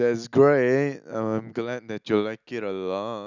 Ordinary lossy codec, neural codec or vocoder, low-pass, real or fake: none; none; 7.2 kHz; real